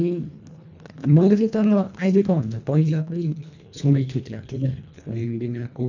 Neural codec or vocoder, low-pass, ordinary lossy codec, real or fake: codec, 24 kHz, 1.5 kbps, HILCodec; 7.2 kHz; none; fake